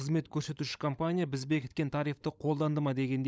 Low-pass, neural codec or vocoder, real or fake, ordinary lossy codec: none; codec, 16 kHz, 16 kbps, FunCodec, trained on LibriTTS, 50 frames a second; fake; none